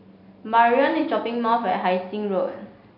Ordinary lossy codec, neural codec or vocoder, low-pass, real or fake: MP3, 32 kbps; none; 5.4 kHz; real